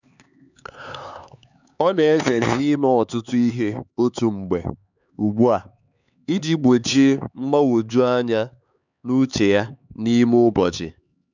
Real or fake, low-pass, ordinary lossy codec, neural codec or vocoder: fake; 7.2 kHz; none; codec, 16 kHz, 4 kbps, X-Codec, HuBERT features, trained on LibriSpeech